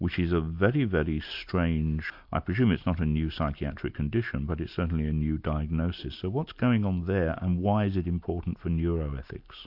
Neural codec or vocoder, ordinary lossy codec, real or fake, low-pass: none; MP3, 48 kbps; real; 5.4 kHz